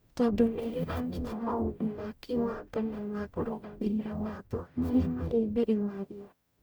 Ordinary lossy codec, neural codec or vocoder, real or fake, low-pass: none; codec, 44.1 kHz, 0.9 kbps, DAC; fake; none